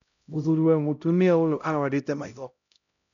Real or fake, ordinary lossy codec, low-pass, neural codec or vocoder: fake; none; 7.2 kHz; codec, 16 kHz, 0.5 kbps, X-Codec, HuBERT features, trained on LibriSpeech